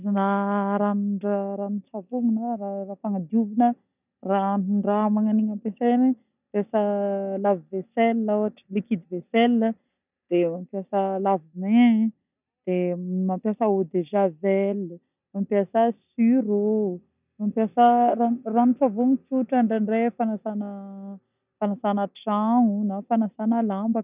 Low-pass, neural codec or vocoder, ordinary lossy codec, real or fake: 3.6 kHz; none; none; real